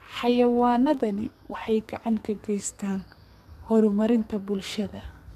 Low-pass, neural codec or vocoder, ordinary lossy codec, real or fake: 14.4 kHz; codec, 44.1 kHz, 2.6 kbps, SNAC; MP3, 96 kbps; fake